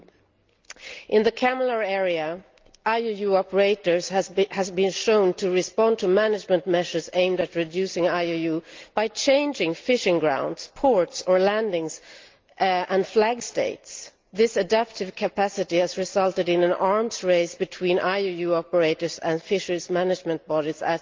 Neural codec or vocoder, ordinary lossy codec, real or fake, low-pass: none; Opus, 32 kbps; real; 7.2 kHz